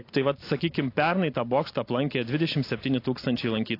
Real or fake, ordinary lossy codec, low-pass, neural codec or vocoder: real; AAC, 32 kbps; 5.4 kHz; none